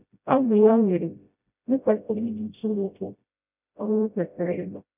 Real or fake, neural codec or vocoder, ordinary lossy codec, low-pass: fake; codec, 16 kHz, 0.5 kbps, FreqCodec, smaller model; none; 3.6 kHz